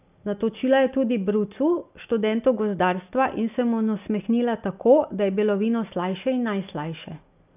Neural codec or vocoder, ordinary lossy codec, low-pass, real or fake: none; none; 3.6 kHz; real